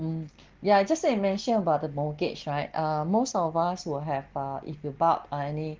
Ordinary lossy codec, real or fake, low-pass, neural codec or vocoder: Opus, 16 kbps; real; 7.2 kHz; none